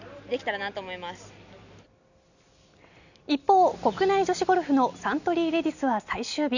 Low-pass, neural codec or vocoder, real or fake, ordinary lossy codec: 7.2 kHz; none; real; none